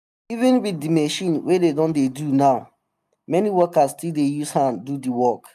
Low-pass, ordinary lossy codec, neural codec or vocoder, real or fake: 14.4 kHz; none; none; real